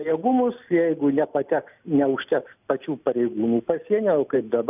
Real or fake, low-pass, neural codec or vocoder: real; 3.6 kHz; none